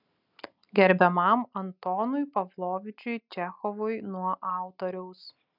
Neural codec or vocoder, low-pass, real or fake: none; 5.4 kHz; real